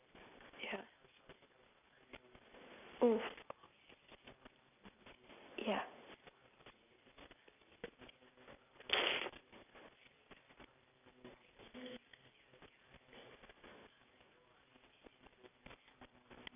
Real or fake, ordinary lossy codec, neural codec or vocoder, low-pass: real; none; none; 3.6 kHz